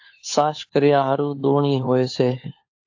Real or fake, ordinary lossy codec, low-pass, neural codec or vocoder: fake; AAC, 48 kbps; 7.2 kHz; codec, 16 kHz, 4 kbps, FunCodec, trained on LibriTTS, 50 frames a second